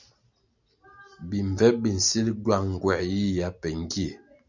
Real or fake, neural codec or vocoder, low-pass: real; none; 7.2 kHz